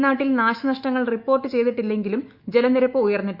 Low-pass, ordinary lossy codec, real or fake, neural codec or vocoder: 5.4 kHz; Opus, 64 kbps; fake; autoencoder, 48 kHz, 128 numbers a frame, DAC-VAE, trained on Japanese speech